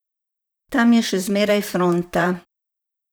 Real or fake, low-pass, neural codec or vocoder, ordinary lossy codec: fake; none; vocoder, 44.1 kHz, 128 mel bands, Pupu-Vocoder; none